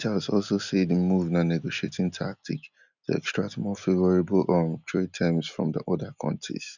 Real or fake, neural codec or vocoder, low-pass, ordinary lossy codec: real; none; 7.2 kHz; none